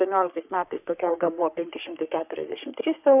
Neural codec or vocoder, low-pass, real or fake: codec, 44.1 kHz, 3.4 kbps, Pupu-Codec; 3.6 kHz; fake